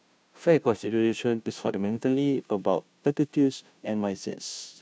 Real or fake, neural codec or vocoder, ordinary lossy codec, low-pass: fake; codec, 16 kHz, 0.5 kbps, FunCodec, trained on Chinese and English, 25 frames a second; none; none